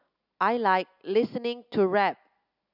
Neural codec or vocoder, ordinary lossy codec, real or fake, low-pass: none; none; real; 5.4 kHz